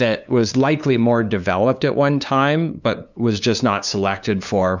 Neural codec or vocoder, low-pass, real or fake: codec, 16 kHz, 2 kbps, FunCodec, trained on LibriTTS, 25 frames a second; 7.2 kHz; fake